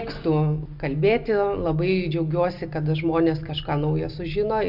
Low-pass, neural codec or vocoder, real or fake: 5.4 kHz; none; real